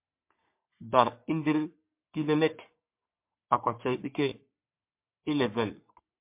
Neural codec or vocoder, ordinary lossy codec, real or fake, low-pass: codec, 44.1 kHz, 3.4 kbps, Pupu-Codec; MP3, 32 kbps; fake; 3.6 kHz